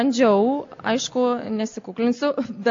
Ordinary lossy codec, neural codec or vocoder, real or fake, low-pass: AAC, 48 kbps; none; real; 7.2 kHz